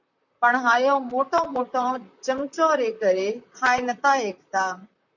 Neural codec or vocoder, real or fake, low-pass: vocoder, 44.1 kHz, 128 mel bands, Pupu-Vocoder; fake; 7.2 kHz